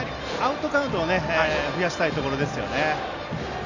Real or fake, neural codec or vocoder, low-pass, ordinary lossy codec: real; none; 7.2 kHz; none